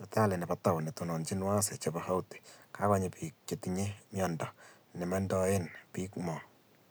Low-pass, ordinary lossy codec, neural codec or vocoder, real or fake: none; none; none; real